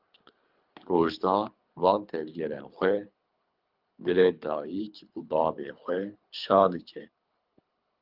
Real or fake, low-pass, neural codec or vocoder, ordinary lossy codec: fake; 5.4 kHz; codec, 24 kHz, 3 kbps, HILCodec; Opus, 24 kbps